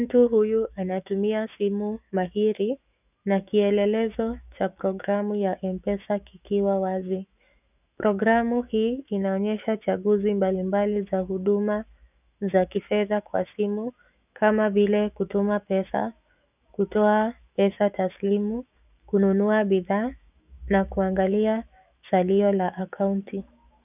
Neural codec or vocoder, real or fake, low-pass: autoencoder, 48 kHz, 128 numbers a frame, DAC-VAE, trained on Japanese speech; fake; 3.6 kHz